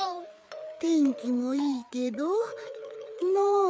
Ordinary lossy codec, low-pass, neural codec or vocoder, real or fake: none; none; codec, 16 kHz, 4 kbps, FreqCodec, larger model; fake